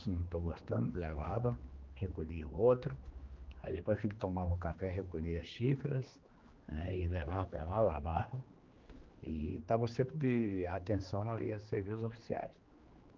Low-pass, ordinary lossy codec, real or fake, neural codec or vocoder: 7.2 kHz; Opus, 32 kbps; fake; codec, 16 kHz, 2 kbps, X-Codec, HuBERT features, trained on general audio